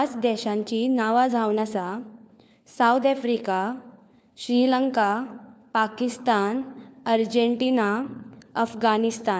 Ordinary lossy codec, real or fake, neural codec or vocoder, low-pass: none; fake; codec, 16 kHz, 4 kbps, FunCodec, trained on LibriTTS, 50 frames a second; none